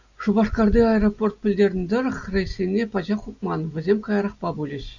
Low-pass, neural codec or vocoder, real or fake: 7.2 kHz; none; real